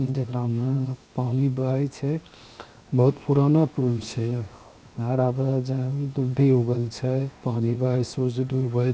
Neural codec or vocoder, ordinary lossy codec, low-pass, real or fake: codec, 16 kHz, 0.7 kbps, FocalCodec; none; none; fake